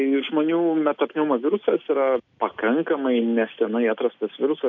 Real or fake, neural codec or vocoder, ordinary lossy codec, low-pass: real; none; MP3, 64 kbps; 7.2 kHz